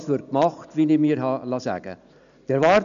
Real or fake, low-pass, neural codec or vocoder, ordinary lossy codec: real; 7.2 kHz; none; none